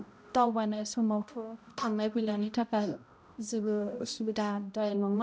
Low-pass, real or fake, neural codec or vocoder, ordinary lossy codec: none; fake; codec, 16 kHz, 0.5 kbps, X-Codec, HuBERT features, trained on balanced general audio; none